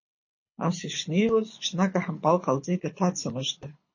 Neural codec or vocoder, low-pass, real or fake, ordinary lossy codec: codec, 24 kHz, 6 kbps, HILCodec; 7.2 kHz; fake; MP3, 32 kbps